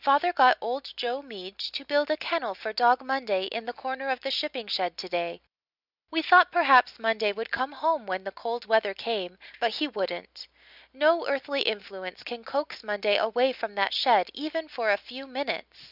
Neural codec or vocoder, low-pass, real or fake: none; 5.4 kHz; real